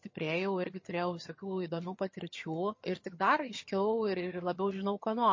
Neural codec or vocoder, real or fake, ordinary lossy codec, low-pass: vocoder, 22.05 kHz, 80 mel bands, HiFi-GAN; fake; MP3, 32 kbps; 7.2 kHz